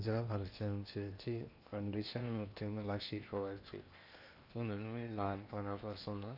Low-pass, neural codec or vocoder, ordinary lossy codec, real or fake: 5.4 kHz; codec, 16 kHz in and 24 kHz out, 0.8 kbps, FocalCodec, streaming, 65536 codes; none; fake